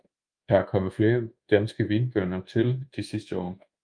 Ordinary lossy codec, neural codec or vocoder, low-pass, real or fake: Opus, 32 kbps; codec, 24 kHz, 1.2 kbps, DualCodec; 9.9 kHz; fake